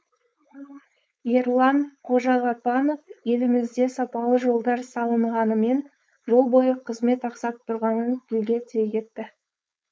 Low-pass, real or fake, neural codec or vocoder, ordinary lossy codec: none; fake; codec, 16 kHz, 4.8 kbps, FACodec; none